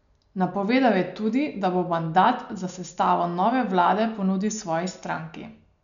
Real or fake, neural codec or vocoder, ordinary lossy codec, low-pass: real; none; none; 7.2 kHz